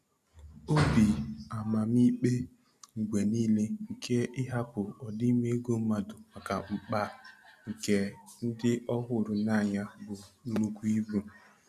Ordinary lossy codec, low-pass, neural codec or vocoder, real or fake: none; 14.4 kHz; none; real